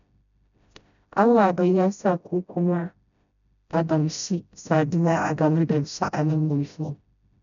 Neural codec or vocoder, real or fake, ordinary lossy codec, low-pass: codec, 16 kHz, 0.5 kbps, FreqCodec, smaller model; fake; none; 7.2 kHz